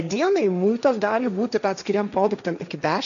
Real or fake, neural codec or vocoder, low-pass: fake; codec, 16 kHz, 1.1 kbps, Voila-Tokenizer; 7.2 kHz